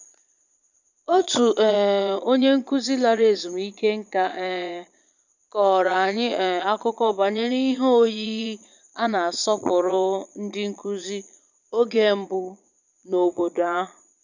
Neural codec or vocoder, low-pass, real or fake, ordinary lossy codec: vocoder, 22.05 kHz, 80 mel bands, Vocos; 7.2 kHz; fake; none